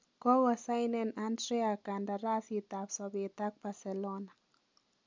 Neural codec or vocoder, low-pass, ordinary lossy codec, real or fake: none; 7.2 kHz; MP3, 64 kbps; real